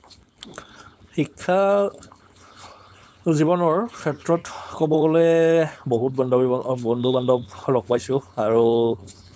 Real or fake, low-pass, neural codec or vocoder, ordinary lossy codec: fake; none; codec, 16 kHz, 4.8 kbps, FACodec; none